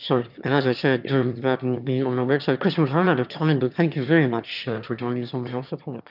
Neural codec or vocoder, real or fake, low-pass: autoencoder, 22.05 kHz, a latent of 192 numbers a frame, VITS, trained on one speaker; fake; 5.4 kHz